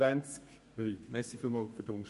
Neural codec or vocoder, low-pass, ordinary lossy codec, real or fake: codec, 32 kHz, 1.9 kbps, SNAC; 14.4 kHz; MP3, 48 kbps; fake